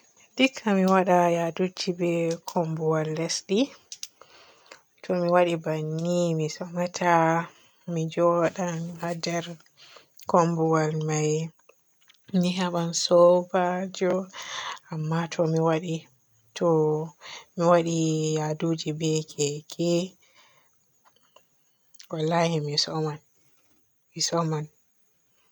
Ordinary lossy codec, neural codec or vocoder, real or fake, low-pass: none; none; real; none